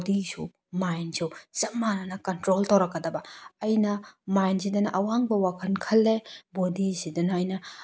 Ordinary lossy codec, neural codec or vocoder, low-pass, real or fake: none; none; none; real